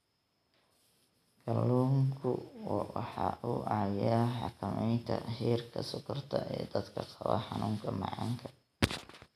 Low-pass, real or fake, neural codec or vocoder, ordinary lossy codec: 14.4 kHz; real; none; none